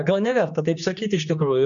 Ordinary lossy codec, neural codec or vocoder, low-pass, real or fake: MP3, 96 kbps; codec, 16 kHz, 2 kbps, X-Codec, HuBERT features, trained on general audio; 7.2 kHz; fake